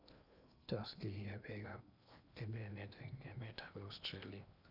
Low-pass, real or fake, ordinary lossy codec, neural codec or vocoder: 5.4 kHz; fake; none; codec, 16 kHz in and 24 kHz out, 0.8 kbps, FocalCodec, streaming, 65536 codes